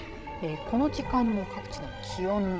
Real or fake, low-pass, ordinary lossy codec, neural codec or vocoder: fake; none; none; codec, 16 kHz, 16 kbps, FreqCodec, larger model